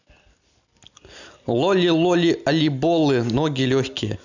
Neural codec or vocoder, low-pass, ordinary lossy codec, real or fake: none; 7.2 kHz; none; real